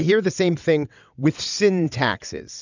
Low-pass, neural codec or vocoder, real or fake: 7.2 kHz; vocoder, 22.05 kHz, 80 mel bands, WaveNeXt; fake